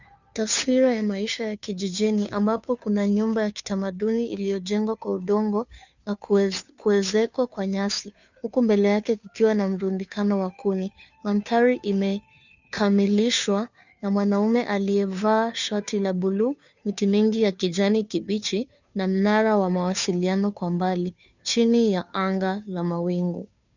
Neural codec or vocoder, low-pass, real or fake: codec, 16 kHz, 2 kbps, FunCodec, trained on Chinese and English, 25 frames a second; 7.2 kHz; fake